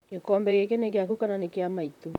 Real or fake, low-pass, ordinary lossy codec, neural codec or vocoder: fake; 19.8 kHz; none; vocoder, 44.1 kHz, 128 mel bands, Pupu-Vocoder